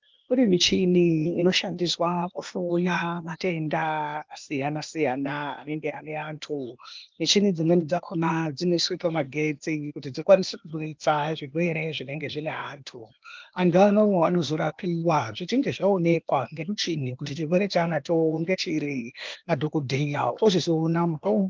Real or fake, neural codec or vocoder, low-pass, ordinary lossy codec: fake; codec, 16 kHz, 0.8 kbps, ZipCodec; 7.2 kHz; Opus, 24 kbps